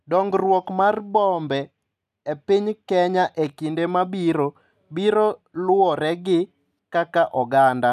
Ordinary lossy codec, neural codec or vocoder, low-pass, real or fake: none; none; 14.4 kHz; real